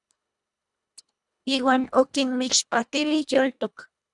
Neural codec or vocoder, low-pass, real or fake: codec, 24 kHz, 1.5 kbps, HILCodec; 10.8 kHz; fake